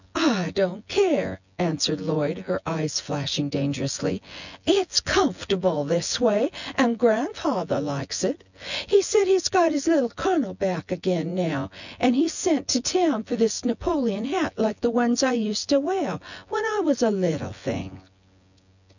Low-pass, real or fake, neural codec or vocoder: 7.2 kHz; fake; vocoder, 24 kHz, 100 mel bands, Vocos